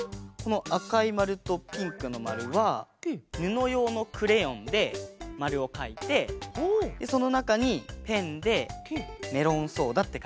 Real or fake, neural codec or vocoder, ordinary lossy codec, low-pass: real; none; none; none